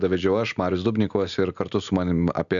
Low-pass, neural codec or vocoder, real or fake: 7.2 kHz; none; real